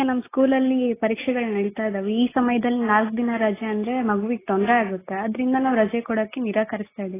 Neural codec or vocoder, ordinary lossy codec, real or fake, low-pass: none; AAC, 16 kbps; real; 3.6 kHz